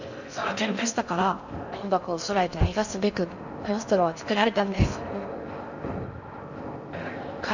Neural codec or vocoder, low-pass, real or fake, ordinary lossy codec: codec, 16 kHz in and 24 kHz out, 0.6 kbps, FocalCodec, streaming, 4096 codes; 7.2 kHz; fake; none